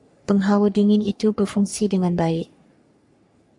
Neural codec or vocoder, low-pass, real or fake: codec, 44.1 kHz, 3.4 kbps, Pupu-Codec; 10.8 kHz; fake